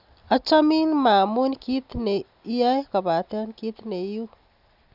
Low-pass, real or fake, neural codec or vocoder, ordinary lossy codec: 5.4 kHz; real; none; none